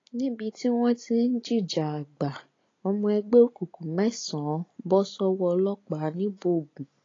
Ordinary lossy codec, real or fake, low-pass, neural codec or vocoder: AAC, 32 kbps; real; 7.2 kHz; none